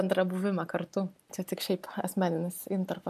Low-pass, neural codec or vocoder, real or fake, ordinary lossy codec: 14.4 kHz; none; real; AAC, 96 kbps